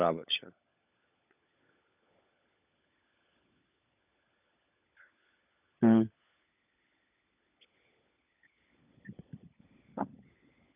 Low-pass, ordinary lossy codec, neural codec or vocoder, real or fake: 3.6 kHz; none; codec, 16 kHz, 16 kbps, FunCodec, trained on LibriTTS, 50 frames a second; fake